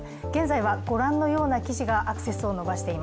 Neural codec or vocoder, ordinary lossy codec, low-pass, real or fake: none; none; none; real